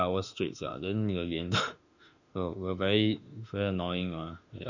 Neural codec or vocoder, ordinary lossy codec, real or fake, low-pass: autoencoder, 48 kHz, 32 numbers a frame, DAC-VAE, trained on Japanese speech; none; fake; 7.2 kHz